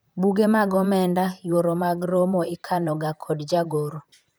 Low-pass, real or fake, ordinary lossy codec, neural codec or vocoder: none; fake; none; vocoder, 44.1 kHz, 128 mel bands, Pupu-Vocoder